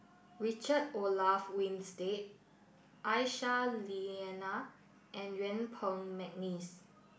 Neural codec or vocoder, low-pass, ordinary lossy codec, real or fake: none; none; none; real